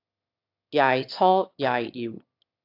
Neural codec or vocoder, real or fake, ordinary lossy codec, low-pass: autoencoder, 22.05 kHz, a latent of 192 numbers a frame, VITS, trained on one speaker; fake; AAC, 32 kbps; 5.4 kHz